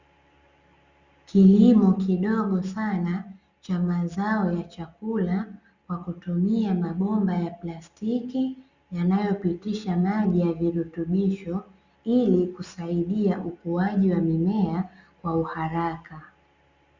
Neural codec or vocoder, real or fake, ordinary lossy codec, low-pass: none; real; Opus, 64 kbps; 7.2 kHz